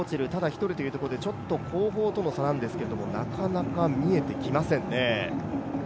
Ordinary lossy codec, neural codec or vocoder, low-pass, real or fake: none; none; none; real